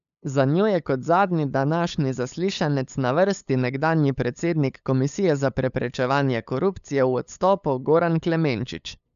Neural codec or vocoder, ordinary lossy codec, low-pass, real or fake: codec, 16 kHz, 8 kbps, FunCodec, trained on LibriTTS, 25 frames a second; none; 7.2 kHz; fake